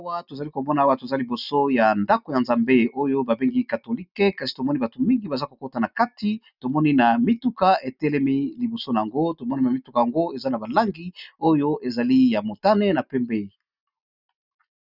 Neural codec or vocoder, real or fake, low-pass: none; real; 5.4 kHz